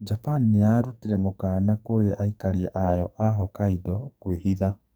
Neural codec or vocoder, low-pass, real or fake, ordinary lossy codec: codec, 44.1 kHz, 2.6 kbps, SNAC; none; fake; none